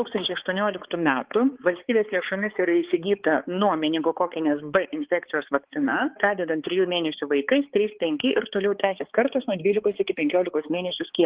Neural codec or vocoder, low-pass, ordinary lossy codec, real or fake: codec, 16 kHz, 4 kbps, X-Codec, HuBERT features, trained on balanced general audio; 3.6 kHz; Opus, 16 kbps; fake